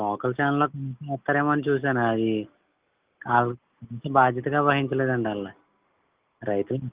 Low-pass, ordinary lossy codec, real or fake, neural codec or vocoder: 3.6 kHz; Opus, 32 kbps; real; none